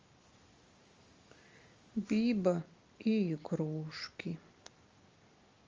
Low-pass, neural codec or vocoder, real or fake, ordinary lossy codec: 7.2 kHz; none; real; Opus, 32 kbps